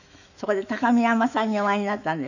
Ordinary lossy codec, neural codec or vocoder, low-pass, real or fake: none; autoencoder, 48 kHz, 128 numbers a frame, DAC-VAE, trained on Japanese speech; 7.2 kHz; fake